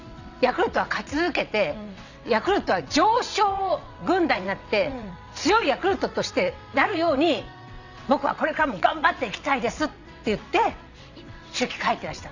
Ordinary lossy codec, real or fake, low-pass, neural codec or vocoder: none; fake; 7.2 kHz; vocoder, 22.05 kHz, 80 mel bands, WaveNeXt